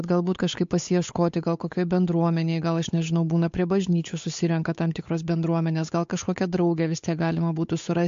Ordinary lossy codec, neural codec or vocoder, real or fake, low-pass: MP3, 48 kbps; codec, 16 kHz, 8 kbps, FunCodec, trained on Chinese and English, 25 frames a second; fake; 7.2 kHz